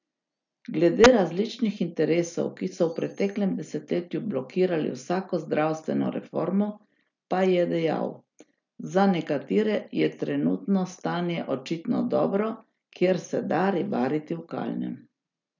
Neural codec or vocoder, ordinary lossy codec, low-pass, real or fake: none; none; 7.2 kHz; real